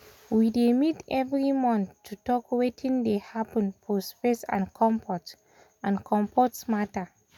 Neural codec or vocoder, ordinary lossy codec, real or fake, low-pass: none; none; real; 19.8 kHz